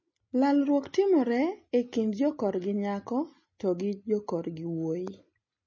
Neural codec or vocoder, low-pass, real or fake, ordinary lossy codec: none; 7.2 kHz; real; MP3, 32 kbps